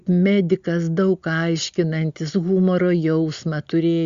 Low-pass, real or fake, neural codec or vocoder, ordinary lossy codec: 7.2 kHz; real; none; Opus, 64 kbps